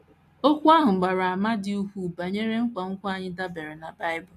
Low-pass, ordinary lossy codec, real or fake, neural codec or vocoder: 14.4 kHz; AAC, 64 kbps; real; none